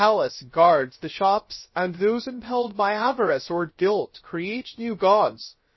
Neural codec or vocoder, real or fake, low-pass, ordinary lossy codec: codec, 16 kHz, 0.3 kbps, FocalCodec; fake; 7.2 kHz; MP3, 24 kbps